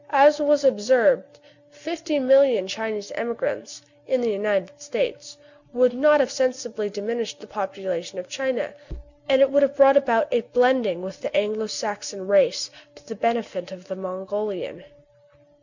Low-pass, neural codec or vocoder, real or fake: 7.2 kHz; none; real